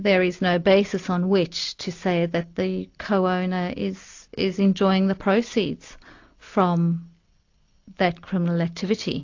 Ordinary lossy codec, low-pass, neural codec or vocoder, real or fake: AAC, 48 kbps; 7.2 kHz; none; real